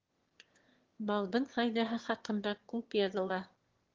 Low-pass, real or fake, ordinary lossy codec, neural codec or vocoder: 7.2 kHz; fake; Opus, 16 kbps; autoencoder, 22.05 kHz, a latent of 192 numbers a frame, VITS, trained on one speaker